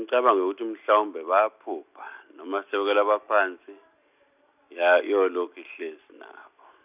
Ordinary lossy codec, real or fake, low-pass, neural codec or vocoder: none; real; 3.6 kHz; none